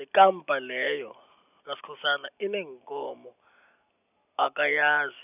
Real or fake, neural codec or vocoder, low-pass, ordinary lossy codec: real; none; 3.6 kHz; none